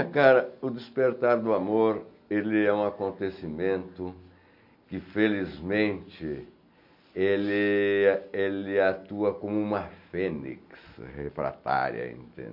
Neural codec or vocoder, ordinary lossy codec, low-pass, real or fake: none; MP3, 48 kbps; 5.4 kHz; real